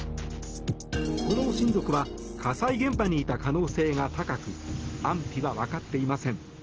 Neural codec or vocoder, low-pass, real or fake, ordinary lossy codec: none; 7.2 kHz; real; Opus, 16 kbps